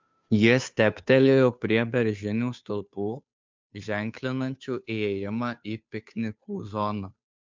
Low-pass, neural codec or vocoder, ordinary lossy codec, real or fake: 7.2 kHz; codec, 16 kHz, 2 kbps, FunCodec, trained on Chinese and English, 25 frames a second; MP3, 64 kbps; fake